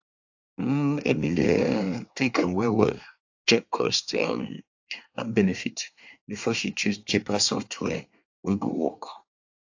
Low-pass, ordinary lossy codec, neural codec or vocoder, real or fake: 7.2 kHz; AAC, 48 kbps; codec, 24 kHz, 1 kbps, SNAC; fake